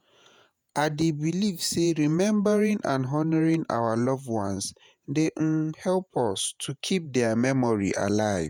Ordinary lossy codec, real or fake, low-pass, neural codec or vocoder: none; fake; none; vocoder, 48 kHz, 128 mel bands, Vocos